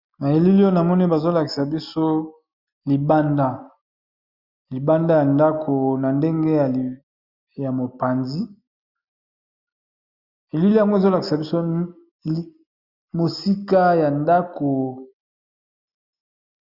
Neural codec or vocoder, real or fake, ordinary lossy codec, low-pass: none; real; Opus, 64 kbps; 5.4 kHz